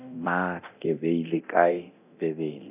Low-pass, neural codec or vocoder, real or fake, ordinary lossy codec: 3.6 kHz; codec, 24 kHz, 0.9 kbps, DualCodec; fake; none